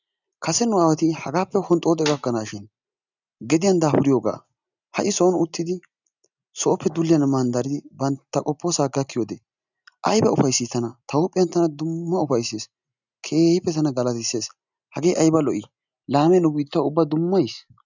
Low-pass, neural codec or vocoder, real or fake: 7.2 kHz; none; real